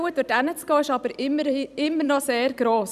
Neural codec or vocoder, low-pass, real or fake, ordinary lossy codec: vocoder, 44.1 kHz, 128 mel bands every 256 samples, BigVGAN v2; 14.4 kHz; fake; none